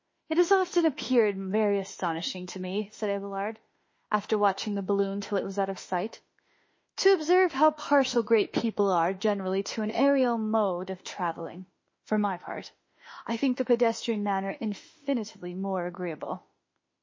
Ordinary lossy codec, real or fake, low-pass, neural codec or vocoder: MP3, 32 kbps; fake; 7.2 kHz; autoencoder, 48 kHz, 32 numbers a frame, DAC-VAE, trained on Japanese speech